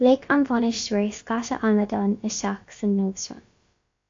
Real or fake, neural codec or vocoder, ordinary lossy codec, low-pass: fake; codec, 16 kHz, about 1 kbps, DyCAST, with the encoder's durations; AAC, 48 kbps; 7.2 kHz